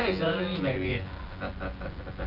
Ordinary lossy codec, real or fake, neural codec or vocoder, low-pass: Opus, 16 kbps; fake; vocoder, 24 kHz, 100 mel bands, Vocos; 5.4 kHz